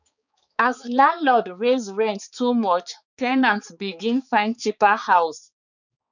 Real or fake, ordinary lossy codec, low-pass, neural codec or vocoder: fake; none; 7.2 kHz; codec, 16 kHz, 4 kbps, X-Codec, HuBERT features, trained on balanced general audio